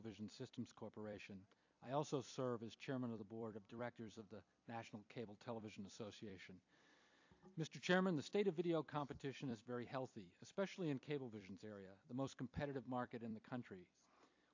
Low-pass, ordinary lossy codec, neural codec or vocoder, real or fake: 7.2 kHz; AAC, 48 kbps; vocoder, 44.1 kHz, 128 mel bands every 256 samples, BigVGAN v2; fake